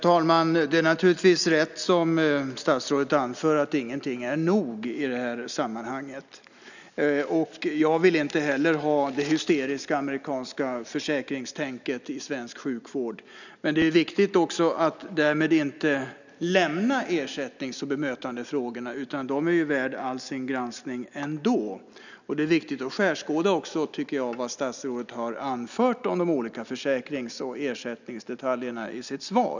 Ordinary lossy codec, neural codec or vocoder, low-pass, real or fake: none; none; 7.2 kHz; real